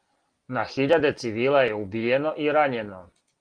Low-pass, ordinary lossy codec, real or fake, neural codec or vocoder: 9.9 kHz; Opus, 24 kbps; real; none